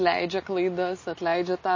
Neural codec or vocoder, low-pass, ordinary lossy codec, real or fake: none; 7.2 kHz; MP3, 32 kbps; real